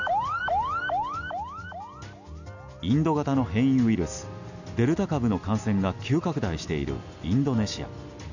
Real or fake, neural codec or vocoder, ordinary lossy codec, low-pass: real; none; none; 7.2 kHz